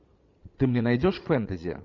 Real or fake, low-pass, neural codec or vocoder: fake; 7.2 kHz; vocoder, 22.05 kHz, 80 mel bands, Vocos